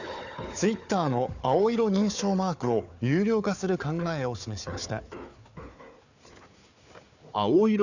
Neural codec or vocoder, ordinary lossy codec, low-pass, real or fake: codec, 16 kHz, 4 kbps, FunCodec, trained on Chinese and English, 50 frames a second; none; 7.2 kHz; fake